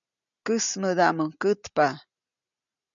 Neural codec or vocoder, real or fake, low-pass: none; real; 7.2 kHz